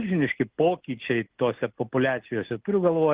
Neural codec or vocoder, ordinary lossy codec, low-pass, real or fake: none; Opus, 16 kbps; 3.6 kHz; real